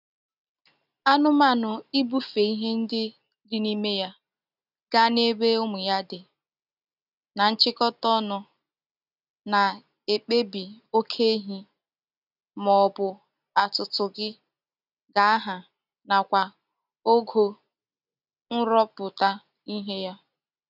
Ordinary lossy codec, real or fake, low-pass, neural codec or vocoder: none; real; 5.4 kHz; none